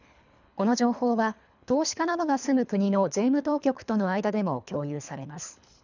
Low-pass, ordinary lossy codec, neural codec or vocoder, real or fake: 7.2 kHz; none; codec, 24 kHz, 3 kbps, HILCodec; fake